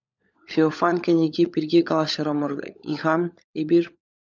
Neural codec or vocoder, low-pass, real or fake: codec, 16 kHz, 16 kbps, FunCodec, trained on LibriTTS, 50 frames a second; 7.2 kHz; fake